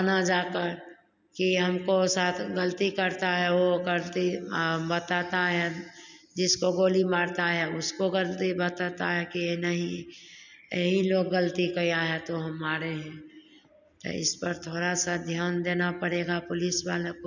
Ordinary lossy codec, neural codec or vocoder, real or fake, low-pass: none; none; real; 7.2 kHz